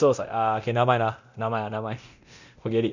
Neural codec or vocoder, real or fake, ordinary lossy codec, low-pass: codec, 24 kHz, 0.9 kbps, DualCodec; fake; none; 7.2 kHz